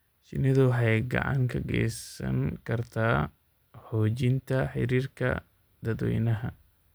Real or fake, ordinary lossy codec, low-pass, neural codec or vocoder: real; none; none; none